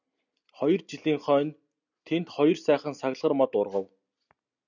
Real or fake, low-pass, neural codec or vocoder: real; 7.2 kHz; none